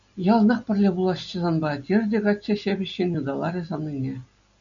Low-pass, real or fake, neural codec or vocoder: 7.2 kHz; real; none